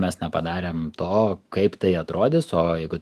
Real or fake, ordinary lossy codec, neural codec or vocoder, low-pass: fake; Opus, 24 kbps; autoencoder, 48 kHz, 128 numbers a frame, DAC-VAE, trained on Japanese speech; 14.4 kHz